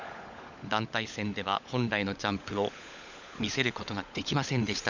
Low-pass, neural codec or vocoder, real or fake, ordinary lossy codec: 7.2 kHz; codec, 16 kHz, 16 kbps, FunCodec, trained on LibriTTS, 50 frames a second; fake; none